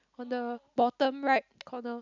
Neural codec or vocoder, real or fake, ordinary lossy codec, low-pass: none; real; none; 7.2 kHz